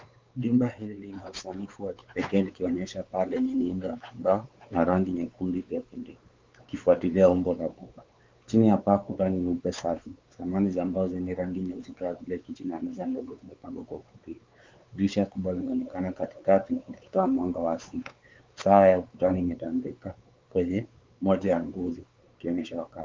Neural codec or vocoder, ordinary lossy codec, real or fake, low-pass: codec, 16 kHz, 4 kbps, X-Codec, WavLM features, trained on Multilingual LibriSpeech; Opus, 24 kbps; fake; 7.2 kHz